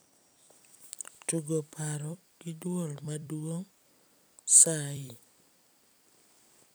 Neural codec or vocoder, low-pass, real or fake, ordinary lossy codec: vocoder, 44.1 kHz, 128 mel bands every 256 samples, BigVGAN v2; none; fake; none